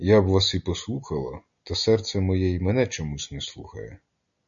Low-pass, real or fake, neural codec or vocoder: 7.2 kHz; real; none